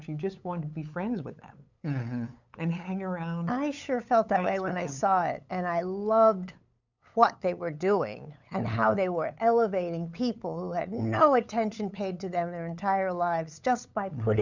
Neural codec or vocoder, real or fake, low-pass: codec, 16 kHz, 8 kbps, FunCodec, trained on LibriTTS, 25 frames a second; fake; 7.2 kHz